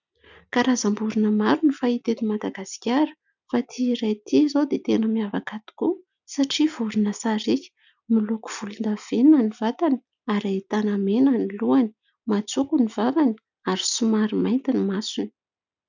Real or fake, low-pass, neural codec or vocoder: real; 7.2 kHz; none